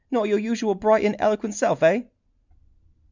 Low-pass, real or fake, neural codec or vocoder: 7.2 kHz; real; none